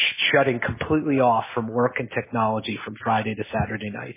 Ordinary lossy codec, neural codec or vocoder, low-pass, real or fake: MP3, 16 kbps; none; 3.6 kHz; real